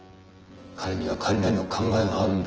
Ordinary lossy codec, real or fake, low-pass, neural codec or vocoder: Opus, 16 kbps; fake; 7.2 kHz; vocoder, 24 kHz, 100 mel bands, Vocos